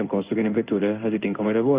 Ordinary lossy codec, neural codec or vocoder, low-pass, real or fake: Opus, 24 kbps; codec, 16 kHz in and 24 kHz out, 1 kbps, XY-Tokenizer; 3.6 kHz; fake